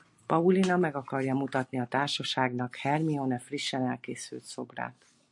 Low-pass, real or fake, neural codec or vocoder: 10.8 kHz; real; none